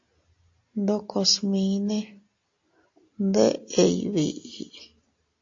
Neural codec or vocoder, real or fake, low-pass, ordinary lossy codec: none; real; 7.2 kHz; AAC, 48 kbps